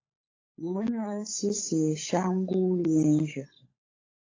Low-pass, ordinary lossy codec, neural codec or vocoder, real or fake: 7.2 kHz; AAC, 32 kbps; codec, 16 kHz, 16 kbps, FunCodec, trained on LibriTTS, 50 frames a second; fake